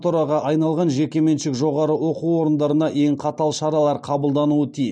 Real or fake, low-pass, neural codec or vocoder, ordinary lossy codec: real; 9.9 kHz; none; none